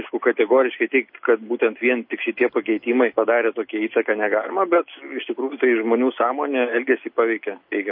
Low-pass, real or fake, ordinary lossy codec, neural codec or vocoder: 5.4 kHz; real; MP3, 32 kbps; none